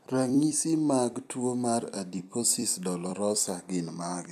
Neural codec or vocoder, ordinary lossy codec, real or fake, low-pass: vocoder, 44.1 kHz, 128 mel bands every 256 samples, BigVGAN v2; none; fake; 14.4 kHz